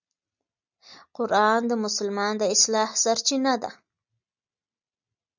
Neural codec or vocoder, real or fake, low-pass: none; real; 7.2 kHz